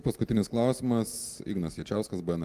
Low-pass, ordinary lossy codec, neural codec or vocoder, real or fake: 14.4 kHz; Opus, 32 kbps; none; real